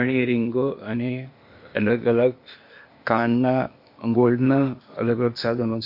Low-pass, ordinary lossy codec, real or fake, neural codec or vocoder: 5.4 kHz; MP3, 32 kbps; fake; codec, 16 kHz, 0.8 kbps, ZipCodec